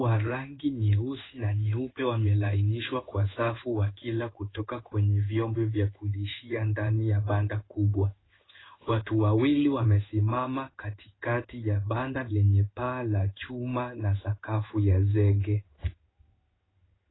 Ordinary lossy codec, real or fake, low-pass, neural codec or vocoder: AAC, 16 kbps; fake; 7.2 kHz; codec, 16 kHz in and 24 kHz out, 1 kbps, XY-Tokenizer